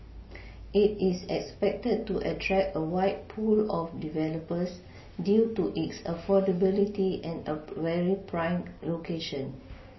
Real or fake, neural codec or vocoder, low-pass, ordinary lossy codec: real; none; 7.2 kHz; MP3, 24 kbps